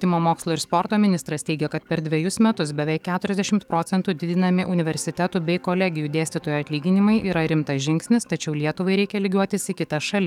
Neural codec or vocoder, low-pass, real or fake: codec, 44.1 kHz, 7.8 kbps, DAC; 19.8 kHz; fake